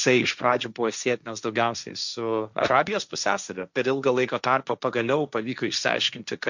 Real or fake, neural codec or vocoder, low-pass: fake; codec, 16 kHz, 1.1 kbps, Voila-Tokenizer; 7.2 kHz